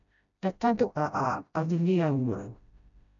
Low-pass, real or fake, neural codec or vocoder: 7.2 kHz; fake; codec, 16 kHz, 0.5 kbps, FreqCodec, smaller model